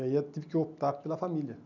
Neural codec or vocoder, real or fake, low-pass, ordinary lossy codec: none; real; 7.2 kHz; none